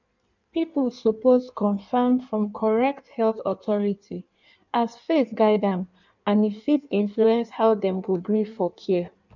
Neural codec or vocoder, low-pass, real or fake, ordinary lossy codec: codec, 16 kHz in and 24 kHz out, 1.1 kbps, FireRedTTS-2 codec; 7.2 kHz; fake; none